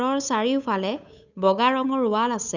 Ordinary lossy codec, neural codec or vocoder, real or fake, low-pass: none; codec, 16 kHz, 8 kbps, FunCodec, trained on Chinese and English, 25 frames a second; fake; 7.2 kHz